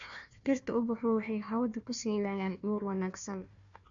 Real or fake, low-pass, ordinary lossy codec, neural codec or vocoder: fake; 7.2 kHz; MP3, 64 kbps; codec, 16 kHz, 1 kbps, FunCodec, trained on Chinese and English, 50 frames a second